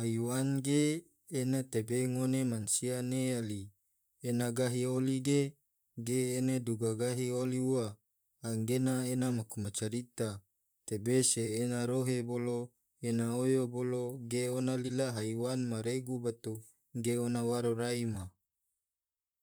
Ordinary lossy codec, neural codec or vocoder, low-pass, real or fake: none; vocoder, 44.1 kHz, 128 mel bands, Pupu-Vocoder; none; fake